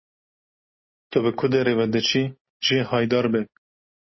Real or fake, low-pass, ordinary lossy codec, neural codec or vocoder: real; 7.2 kHz; MP3, 24 kbps; none